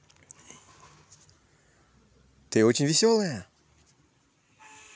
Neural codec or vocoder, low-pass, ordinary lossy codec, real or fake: none; none; none; real